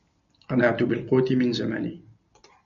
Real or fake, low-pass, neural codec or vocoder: real; 7.2 kHz; none